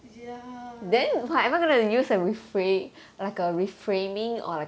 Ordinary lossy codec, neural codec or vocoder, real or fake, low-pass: none; none; real; none